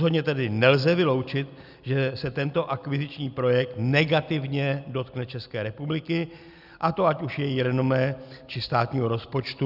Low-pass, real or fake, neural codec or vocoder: 5.4 kHz; real; none